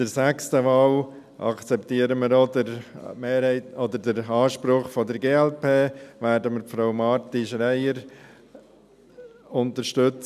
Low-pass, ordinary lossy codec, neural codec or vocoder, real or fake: 14.4 kHz; none; none; real